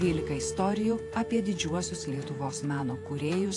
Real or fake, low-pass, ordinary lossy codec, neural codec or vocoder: fake; 10.8 kHz; AAC, 48 kbps; vocoder, 48 kHz, 128 mel bands, Vocos